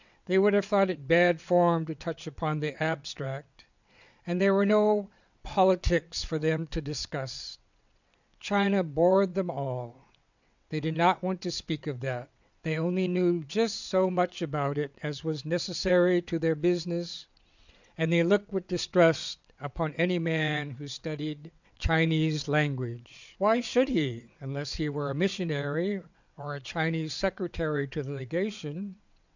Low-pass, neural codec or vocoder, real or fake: 7.2 kHz; vocoder, 22.05 kHz, 80 mel bands, WaveNeXt; fake